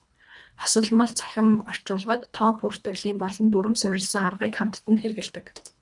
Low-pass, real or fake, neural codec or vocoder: 10.8 kHz; fake; codec, 24 kHz, 1.5 kbps, HILCodec